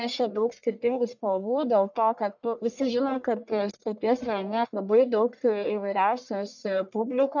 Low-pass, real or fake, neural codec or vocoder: 7.2 kHz; fake; codec, 44.1 kHz, 1.7 kbps, Pupu-Codec